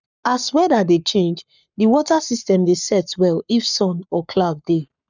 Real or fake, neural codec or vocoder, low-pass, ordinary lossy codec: fake; codec, 44.1 kHz, 7.8 kbps, Pupu-Codec; 7.2 kHz; none